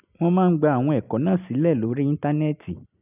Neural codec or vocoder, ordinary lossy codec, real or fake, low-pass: none; none; real; 3.6 kHz